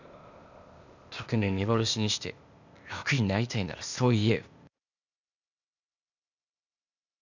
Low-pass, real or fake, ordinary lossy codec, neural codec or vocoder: 7.2 kHz; fake; none; codec, 16 kHz, 0.8 kbps, ZipCodec